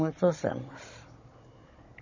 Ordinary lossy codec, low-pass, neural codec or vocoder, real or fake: MP3, 32 kbps; 7.2 kHz; codec, 16 kHz, 16 kbps, FunCodec, trained on Chinese and English, 50 frames a second; fake